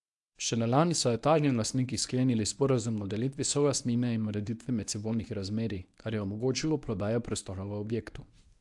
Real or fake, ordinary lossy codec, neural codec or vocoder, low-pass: fake; AAC, 64 kbps; codec, 24 kHz, 0.9 kbps, WavTokenizer, medium speech release version 1; 10.8 kHz